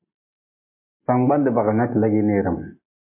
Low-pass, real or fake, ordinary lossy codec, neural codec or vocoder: 3.6 kHz; real; MP3, 24 kbps; none